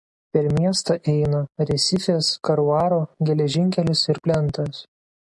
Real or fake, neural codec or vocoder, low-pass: real; none; 10.8 kHz